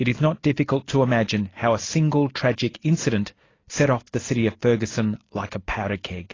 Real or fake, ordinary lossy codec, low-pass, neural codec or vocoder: real; AAC, 32 kbps; 7.2 kHz; none